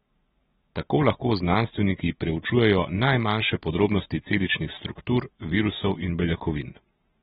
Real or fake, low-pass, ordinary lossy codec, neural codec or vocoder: real; 19.8 kHz; AAC, 16 kbps; none